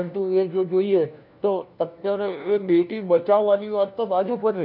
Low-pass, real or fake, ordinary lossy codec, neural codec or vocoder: 5.4 kHz; fake; none; codec, 16 kHz, 1 kbps, FunCodec, trained on LibriTTS, 50 frames a second